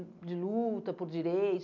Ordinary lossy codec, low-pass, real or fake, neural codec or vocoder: none; 7.2 kHz; real; none